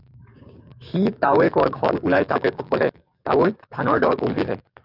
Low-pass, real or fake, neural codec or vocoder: 5.4 kHz; fake; codec, 44.1 kHz, 7.8 kbps, DAC